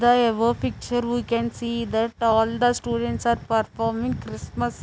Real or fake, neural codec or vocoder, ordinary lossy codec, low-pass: real; none; none; none